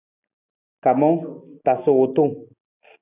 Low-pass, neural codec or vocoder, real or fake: 3.6 kHz; none; real